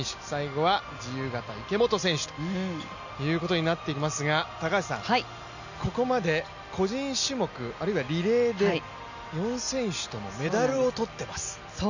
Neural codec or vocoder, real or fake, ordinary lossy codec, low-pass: none; real; none; 7.2 kHz